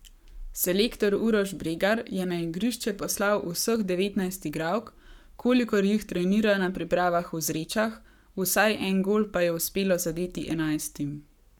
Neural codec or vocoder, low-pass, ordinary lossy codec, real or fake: codec, 44.1 kHz, 7.8 kbps, Pupu-Codec; 19.8 kHz; none; fake